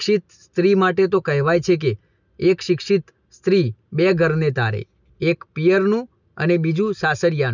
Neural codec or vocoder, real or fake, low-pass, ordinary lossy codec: none; real; 7.2 kHz; none